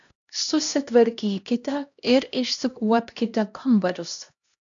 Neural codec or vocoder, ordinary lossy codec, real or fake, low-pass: codec, 16 kHz, 1 kbps, X-Codec, HuBERT features, trained on LibriSpeech; AAC, 64 kbps; fake; 7.2 kHz